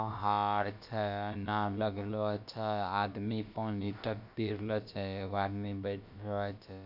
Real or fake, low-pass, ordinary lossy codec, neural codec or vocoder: fake; 5.4 kHz; none; codec, 16 kHz, about 1 kbps, DyCAST, with the encoder's durations